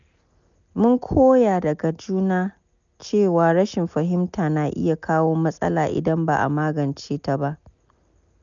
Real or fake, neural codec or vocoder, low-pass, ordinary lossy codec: real; none; 7.2 kHz; none